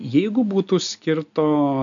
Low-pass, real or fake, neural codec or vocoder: 7.2 kHz; real; none